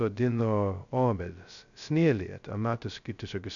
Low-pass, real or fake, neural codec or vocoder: 7.2 kHz; fake; codec, 16 kHz, 0.2 kbps, FocalCodec